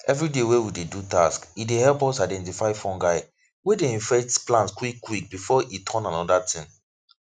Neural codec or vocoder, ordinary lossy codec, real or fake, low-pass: none; none; real; 9.9 kHz